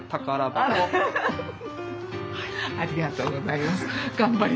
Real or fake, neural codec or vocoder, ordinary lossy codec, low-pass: real; none; none; none